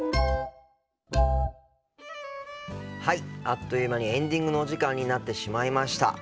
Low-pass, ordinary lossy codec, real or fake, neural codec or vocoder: none; none; real; none